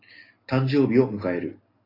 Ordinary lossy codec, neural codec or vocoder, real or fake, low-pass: AAC, 24 kbps; none; real; 5.4 kHz